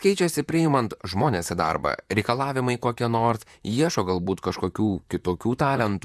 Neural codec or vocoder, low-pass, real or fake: vocoder, 44.1 kHz, 128 mel bands, Pupu-Vocoder; 14.4 kHz; fake